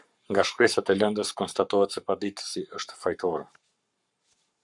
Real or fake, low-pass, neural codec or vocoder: fake; 10.8 kHz; codec, 44.1 kHz, 7.8 kbps, Pupu-Codec